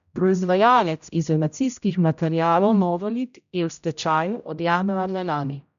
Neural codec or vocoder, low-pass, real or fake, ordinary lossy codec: codec, 16 kHz, 0.5 kbps, X-Codec, HuBERT features, trained on general audio; 7.2 kHz; fake; none